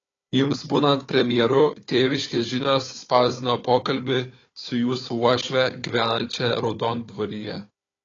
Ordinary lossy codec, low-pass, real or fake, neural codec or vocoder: AAC, 32 kbps; 7.2 kHz; fake; codec, 16 kHz, 4 kbps, FunCodec, trained on Chinese and English, 50 frames a second